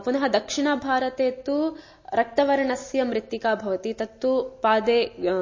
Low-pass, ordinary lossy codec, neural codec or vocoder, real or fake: 7.2 kHz; MP3, 32 kbps; none; real